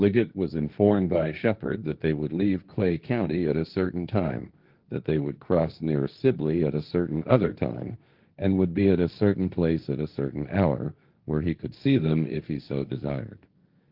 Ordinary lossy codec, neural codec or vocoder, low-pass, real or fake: Opus, 32 kbps; codec, 16 kHz, 1.1 kbps, Voila-Tokenizer; 5.4 kHz; fake